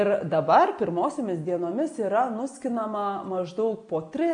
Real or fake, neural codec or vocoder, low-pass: real; none; 9.9 kHz